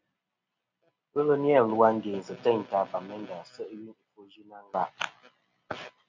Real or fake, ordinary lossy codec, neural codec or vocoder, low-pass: real; MP3, 64 kbps; none; 7.2 kHz